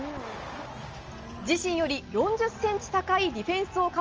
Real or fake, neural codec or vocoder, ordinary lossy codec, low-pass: real; none; Opus, 24 kbps; 7.2 kHz